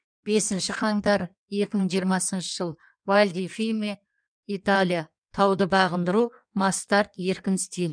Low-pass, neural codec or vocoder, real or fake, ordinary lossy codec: 9.9 kHz; codec, 16 kHz in and 24 kHz out, 1.1 kbps, FireRedTTS-2 codec; fake; none